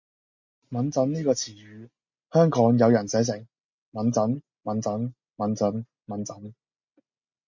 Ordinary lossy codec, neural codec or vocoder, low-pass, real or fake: MP3, 48 kbps; none; 7.2 kHz; real